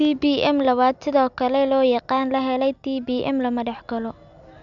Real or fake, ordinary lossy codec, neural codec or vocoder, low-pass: real; none; none; 7.2 kHz